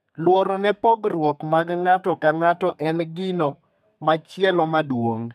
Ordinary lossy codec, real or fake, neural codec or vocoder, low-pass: none; fake; codec, 32 kHz, 1.9 kbps, SNAC; 14.4 kHz